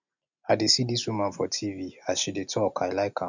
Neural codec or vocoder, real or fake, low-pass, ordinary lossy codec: none; real; 7.2 kHz; none